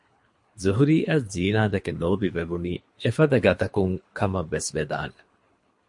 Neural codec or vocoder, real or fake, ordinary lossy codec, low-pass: codec, 24 kHz, 3 kbps, HILCodec; fake; MP3, 48 kbps; 10.8 kHz